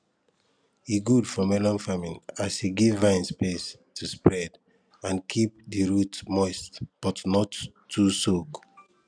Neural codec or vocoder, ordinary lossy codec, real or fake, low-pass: none; none; real; 9.9 kHz